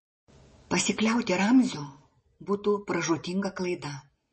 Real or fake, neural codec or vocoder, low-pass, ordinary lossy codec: real; none; 10.8 kHz; MP3, 32 kbps